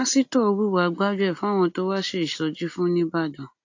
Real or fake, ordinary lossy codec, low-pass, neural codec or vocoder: real; AAC, 48 kbps; 7.2 kHz; none